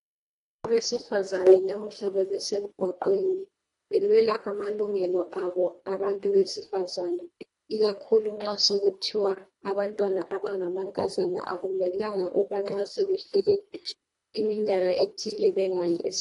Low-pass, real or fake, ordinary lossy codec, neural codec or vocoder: 10.8 kHz; fake; AAC, 48 kbps; codec, 24 kHz, 1.5 kbps, HILCodec